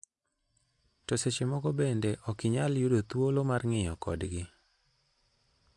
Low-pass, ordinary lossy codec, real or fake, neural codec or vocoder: 10.8 kHz; AAC, 64 kbps; real; none